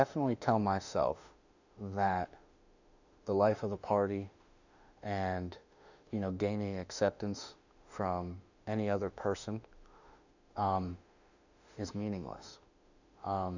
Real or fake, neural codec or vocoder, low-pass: fake; autoencoder, 48 kHz, 32 numbers a frame, DAC-VAE, trained on Japanese speech; 7.2 kHz